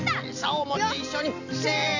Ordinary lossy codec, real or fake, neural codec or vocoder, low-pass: none; real; none; 7.2 kHz